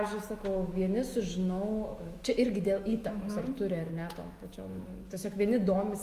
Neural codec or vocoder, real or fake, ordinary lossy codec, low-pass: autoencoder, 48 kHz, 128 numbers a frame, DAC-VAE, trained on Japanese speech; fake; Opus, 32 kbps; 14.4 kHz